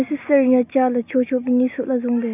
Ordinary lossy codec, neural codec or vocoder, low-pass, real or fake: none; none; 3.6 kHz; real